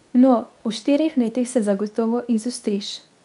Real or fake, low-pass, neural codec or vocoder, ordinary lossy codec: fake; 10.8 kHz; codec, 24 kHz, 0.9 kbps, WavTokenizer, medium speech release version 2; none